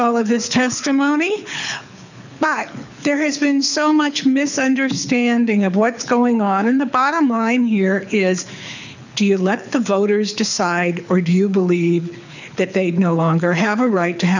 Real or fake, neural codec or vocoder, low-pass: fake; codec, 24 kHz, 6 kbps, HILCodec; 7.2 kHz